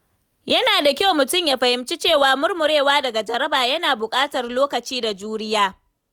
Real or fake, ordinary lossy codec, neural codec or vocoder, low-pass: real; none; none; 19.8 kHz